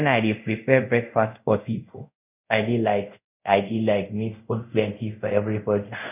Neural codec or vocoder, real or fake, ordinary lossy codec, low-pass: codec, 24 kHz, 0.5 kbps, DualCodec; fake; none; 3.6 kHz